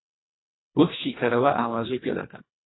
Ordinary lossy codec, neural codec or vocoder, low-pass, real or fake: AAC, 16 kbps; codec, 24 kHz, 1.5 kbps, HILCodec; 7.2 kHz; fake